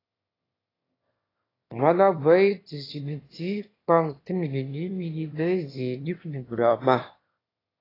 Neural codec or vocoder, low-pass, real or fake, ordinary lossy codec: autoencoder, 22.05 kHz, a latent of 192 numbers a frame, VITS, trained on one speaker; 5.4 kHz; fake; AAC, 24 kbps